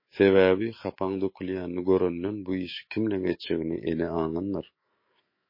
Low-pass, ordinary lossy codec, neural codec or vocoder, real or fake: 5.4 kHz; MP3, 24 kbps; autoencoder, 48 kHz, 128 numbers a frame, DAC-VAE, trained on Japanese speech; fake